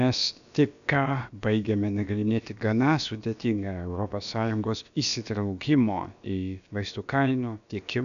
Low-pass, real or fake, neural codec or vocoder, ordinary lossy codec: 7.2 kHz; fake; codec, 16 kHz, about 1 kbps, DyCAST, with the encoder's durations; MP3, 96 kbps